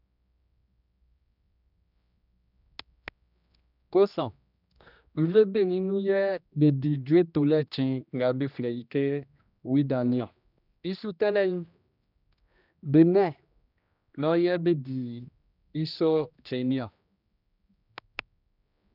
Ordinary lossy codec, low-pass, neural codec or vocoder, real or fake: none; 5.4 kHz; codec, 16 kHz, 1 kbps, X-Codec, HuBERT features, trained on general audio; fake